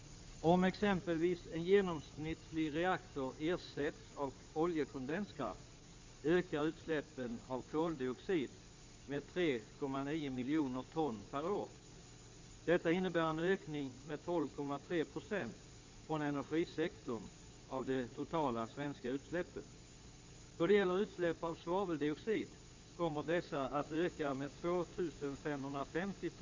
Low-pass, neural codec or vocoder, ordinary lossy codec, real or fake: 7.2 kHz; codec, 16 kHz in and 24 kHz out, 2.2 kbps, FireRedTTS-2 codec; MP3, 64 kbps; fake